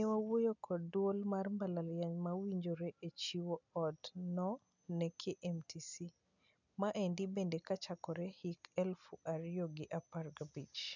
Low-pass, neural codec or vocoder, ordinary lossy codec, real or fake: 7.2 kHz; none; none; real